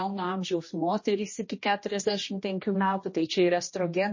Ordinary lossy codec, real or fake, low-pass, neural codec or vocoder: MP3, 32 kbps; fake; 7.2 kHz; codec, 16 kHz, 1 kbps, X-Codec, HuBERT features, trained on general audio